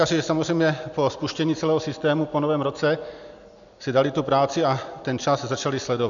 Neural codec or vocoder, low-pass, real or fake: none; 7.2 kHz; real